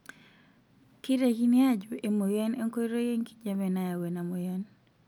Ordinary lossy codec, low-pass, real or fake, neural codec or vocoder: none; 19.8 kHz; real; none